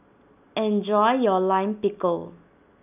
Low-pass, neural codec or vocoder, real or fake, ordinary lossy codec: 3.6 kHz; none; real; none